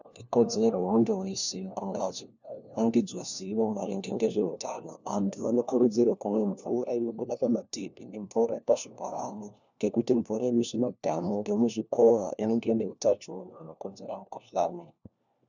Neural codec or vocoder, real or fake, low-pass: codec, 16 kHz, 1 kbps, FunCodec, trained on LibriTTS, 50 frames a second; fake; 7.2 kHz